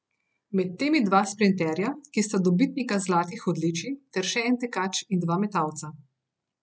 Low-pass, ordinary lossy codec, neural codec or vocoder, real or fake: none; none; none; real